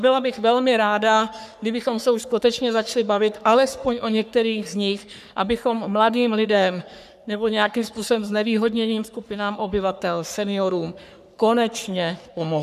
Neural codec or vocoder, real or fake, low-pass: codec, 44.1 kHz, 3.4 kbps, Pupu-Codec; fake; 14.4 kHz